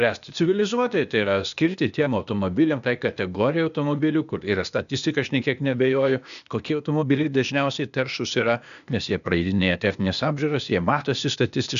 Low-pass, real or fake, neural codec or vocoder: 7.2 kHz; fake; codec, 16 kHz, 0.8 kbps, ZipCodec